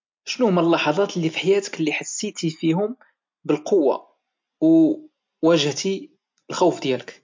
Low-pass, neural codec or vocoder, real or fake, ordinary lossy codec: 7.2 kHz; none; real; MP3, 48 kbps